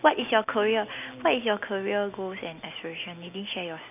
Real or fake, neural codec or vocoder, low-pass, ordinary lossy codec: real; none; 3.6 kHz; none